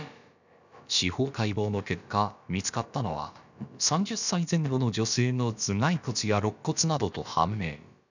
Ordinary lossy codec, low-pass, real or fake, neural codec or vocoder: none; 7.2 kHz; fake; codec, 16 kHz, about 1 kbps, DyCAST, with the encoder's durations